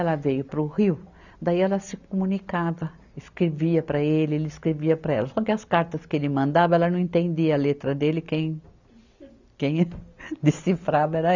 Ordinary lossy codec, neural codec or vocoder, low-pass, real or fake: none; none; 7.2 kHz; real